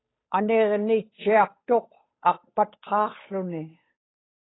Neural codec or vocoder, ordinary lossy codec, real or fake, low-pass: codec, 16 kHz, 8 kbps, FunCodec, trained on Chinese and English, 25 frames a second; AAC, 16 kbps; fake; 7.2 kHz